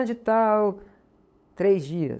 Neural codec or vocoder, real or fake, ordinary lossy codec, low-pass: codec, 16 kHz, 8 kbps, FunCodec, trained on LibriTTS, 25 frames a second; fake; none; none